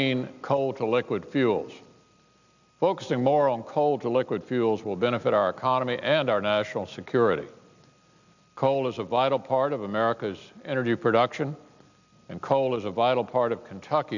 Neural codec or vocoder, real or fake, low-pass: none; real; 7.2 kHz